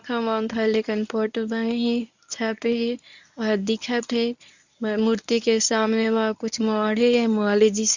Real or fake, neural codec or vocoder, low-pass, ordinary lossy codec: fake; codec, 24 kHz, 0.9 kbps, WavTokenizer, medium speech release version 1; 7.2 kHz; none